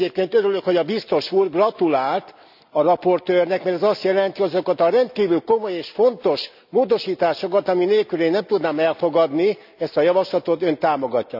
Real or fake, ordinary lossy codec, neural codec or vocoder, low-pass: real; none; none; 5.4 kHz